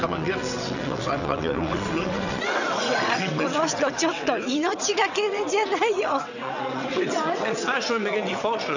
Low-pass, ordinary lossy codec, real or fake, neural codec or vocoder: 7.2 kHz; none; fake; vocoder, 22.05 kHz, 80 mel bands, WaveNeXt